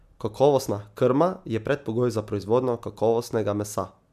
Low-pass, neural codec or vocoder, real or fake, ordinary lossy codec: 14.4 kHz; none; real; none